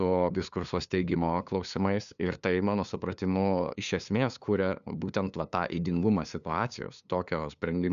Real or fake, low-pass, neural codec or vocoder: fake; 7.2 kHz; codec, 16 kHz, 2 kbps, FunCodec, trained on LibriTTS, 25 frames a second